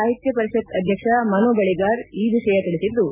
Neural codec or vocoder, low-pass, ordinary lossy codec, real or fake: none; 3.6 kHz; none; real